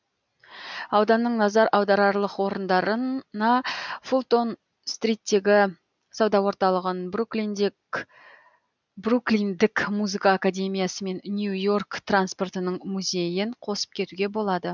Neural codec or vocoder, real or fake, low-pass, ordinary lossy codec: none; real; 7.2 kHz; none